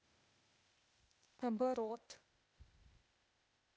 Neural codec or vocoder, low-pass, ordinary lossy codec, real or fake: codec, 16 kHz, 0.8 kbps, ZipCodec; none; none; fake